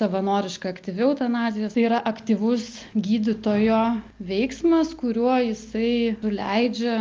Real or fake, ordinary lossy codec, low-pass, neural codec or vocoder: real; Opus, 24 kbps; 7.2 kHz; none